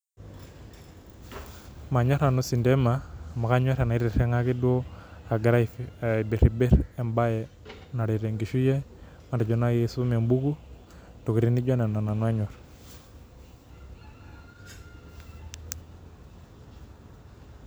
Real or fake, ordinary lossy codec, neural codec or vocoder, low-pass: real; none; none; none